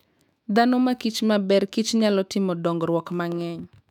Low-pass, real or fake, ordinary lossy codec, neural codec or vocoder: 19.8 kHz; fake; none; autoencoder, 48 kHz, 128 numbers a frame, DAC-VAE, trained on Japanese speech